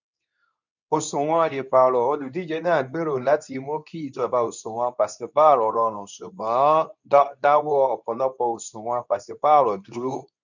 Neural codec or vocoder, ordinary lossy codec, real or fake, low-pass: codec, 24 kHz, 0.9 kbps, WavTokenizer, medium speech release version 2; none; fake; 7.2 kHz